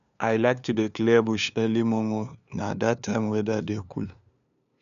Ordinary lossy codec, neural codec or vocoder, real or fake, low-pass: none; codec, 16 kHz, 2 kbps, FunCodec, trained on LibriTTS, 25 frames a second; fake; 7.2 kHz